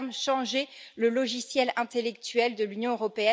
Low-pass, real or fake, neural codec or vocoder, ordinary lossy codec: none; real; none; none